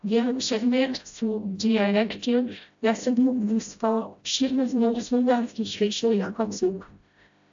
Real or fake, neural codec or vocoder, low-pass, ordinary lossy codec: fake; codec, 16 kHz, 0.5 kbps, FreqCodec, smaller model; 7.2 kHz; AAC, 64 kbps